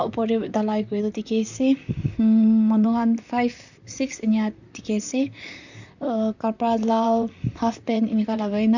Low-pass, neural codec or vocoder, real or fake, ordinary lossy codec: 7.2 kHz; vocoder, 44.1 kHz, 128 mel bands, Pupu-Vocoder; fake; none